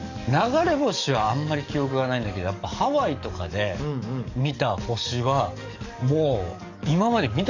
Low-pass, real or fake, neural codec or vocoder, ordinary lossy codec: 7.2 kHz; fake; codec, 44.1 kHz, 7.8 kbps, DAC; none